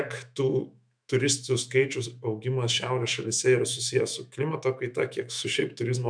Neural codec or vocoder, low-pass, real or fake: autoencoder, 48 kHz, 128 numbers a frame, DAC-VAE, trained on Japanese speech; 9.9 kHz; fake